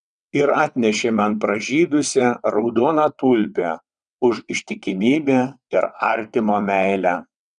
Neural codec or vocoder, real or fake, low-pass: vocoder, 22.05 kHz, 80 mel bands, WaveNeXt; fake; 9.9 kHz